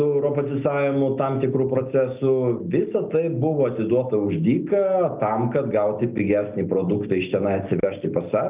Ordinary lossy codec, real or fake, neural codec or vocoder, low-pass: Opus, 24 kbps; real; none; 3.6 kHz